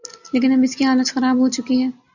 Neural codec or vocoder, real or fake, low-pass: none; real; 7.2 kHz